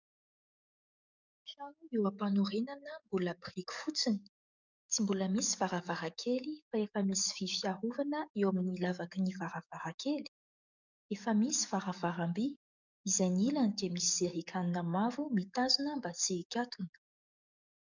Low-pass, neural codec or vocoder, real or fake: 7.2 kHz; codec, 44.1 kHz, 7.8 kbps, DAC; fake